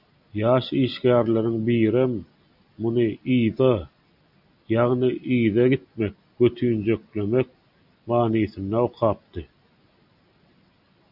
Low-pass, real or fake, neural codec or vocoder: 5.4 kHz; real; none